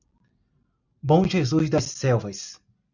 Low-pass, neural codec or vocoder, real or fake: 7.2 kHz; none; real